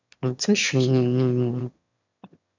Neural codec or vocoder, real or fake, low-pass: autoencoder, 22.05 kHz, a latent of 192 numbers a frame, VITS, trained on one speaker; fake; 7.2 kHz